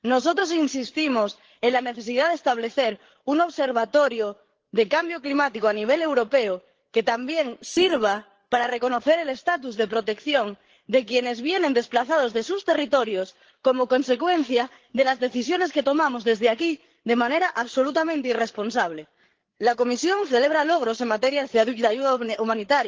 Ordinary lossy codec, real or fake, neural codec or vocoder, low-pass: Opus, 16 kbps; fake; codec, 16 kHz, 8 kbps, FreqCodec, larger model; 7.2 kHz